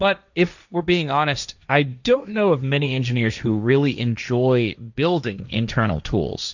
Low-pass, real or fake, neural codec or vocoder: 7.2 kHz; fake; codec, 16 kHz, 1.1 kbps, Voila-Tokenizer